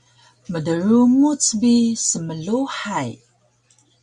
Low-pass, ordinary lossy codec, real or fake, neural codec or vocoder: 10.8 kHz; Opus, 64 kbps; real; none